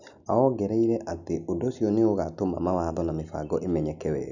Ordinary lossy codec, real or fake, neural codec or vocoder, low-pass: none; real; none; 7.2 kHz